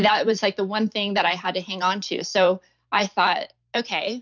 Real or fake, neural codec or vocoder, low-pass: fake; vocoder, 44.1 kHz, 128 mel bands every 512 samples, BigVGAN v2; 7.2 kHz